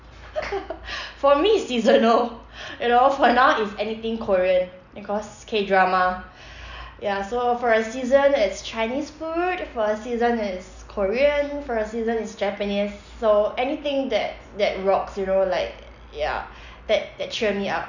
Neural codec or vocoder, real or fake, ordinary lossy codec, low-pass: none; real; none; 7.2 kHz